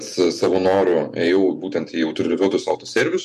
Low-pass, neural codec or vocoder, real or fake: 14.4 kHz; vocoder, 48 kHz, 128 mel bands, Vocos; fake